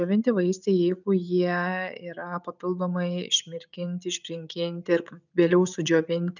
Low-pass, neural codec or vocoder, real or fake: 7.2 kHz; none; real